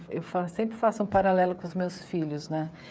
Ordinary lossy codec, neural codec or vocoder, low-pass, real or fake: none; codec, 16 kHz, 16 kbps, FreqCodec, smaller model; none; fake